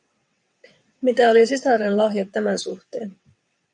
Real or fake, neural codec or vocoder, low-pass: fake; vocoder, 22.05 kHz, 80 mel bands, WaveNeXt; 9.9 kHz